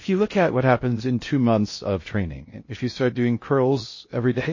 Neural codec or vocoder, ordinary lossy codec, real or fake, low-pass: codec, 16 kHz in and 24 kHz out, 0.6 kbps, FocalCodec, streaming, 4096 codes; MP3, 32 kbps; fake; 7.2 kHz